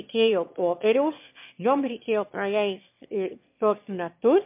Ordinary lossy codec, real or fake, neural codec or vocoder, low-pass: MP3, 32 kbps; fake; autoencoder, 22.05 kHz, a latent of 192 numbers a frame, VITS, trained on one speaker; 3.6 kHz